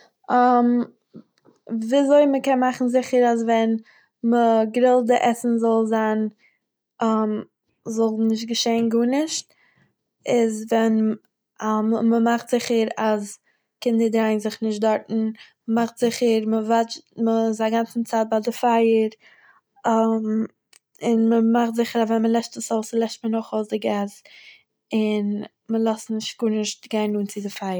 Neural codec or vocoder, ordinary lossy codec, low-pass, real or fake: none; none; none; real